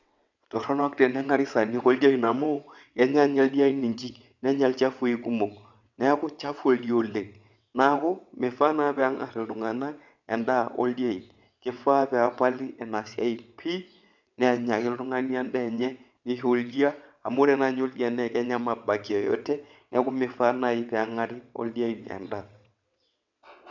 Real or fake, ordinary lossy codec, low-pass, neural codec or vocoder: fake; none; 7.2 kHz; vocoder, 22.05 kHz, 80 mel bands, WaveNeXt